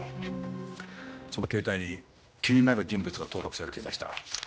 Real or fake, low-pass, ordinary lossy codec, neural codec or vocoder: fake; none; none; codec, 16 kHz, 1 kbps, X-Codec, HuBERT features, trained on general audio